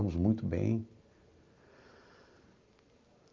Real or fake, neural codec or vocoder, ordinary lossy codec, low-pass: real; none; Opus, 32 kbps; 7.2 kHz